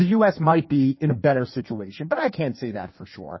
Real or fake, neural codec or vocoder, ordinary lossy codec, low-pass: fake; codec, 16 kHz in and 24 kHz out, 1.1 kbps, FireRedTTS-2 codec; MP3, 24 kbps; 7.2 kHz